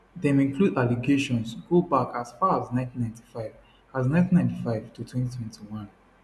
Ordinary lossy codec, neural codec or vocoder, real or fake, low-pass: none; none; real; none